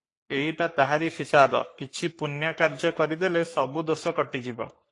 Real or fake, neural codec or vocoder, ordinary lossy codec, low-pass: fake; codec, 44.1 kHz, 3.4 kbps, Pupu-Codec; AAC, 48 kbps; 10.8 kHz